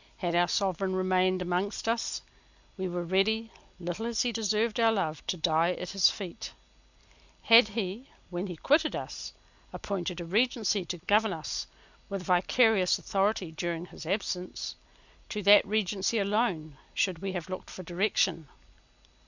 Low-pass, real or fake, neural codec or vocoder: 7.2 kHz; real; none